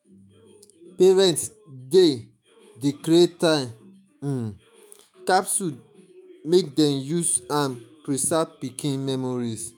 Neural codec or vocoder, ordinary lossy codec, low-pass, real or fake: autoencoder, 48 kHz, 128 numbers a frame, DAC-VAE, trained on Japanese speech; none; none; fake